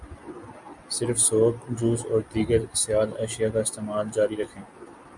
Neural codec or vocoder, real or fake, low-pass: none; real; 10.8 kHz